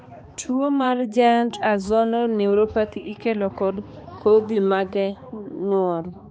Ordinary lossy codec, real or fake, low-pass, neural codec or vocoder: none; fake; none; codec, 16 kHz, 2 kbps, X-Codec, HuBERT features, trained on balanced general audio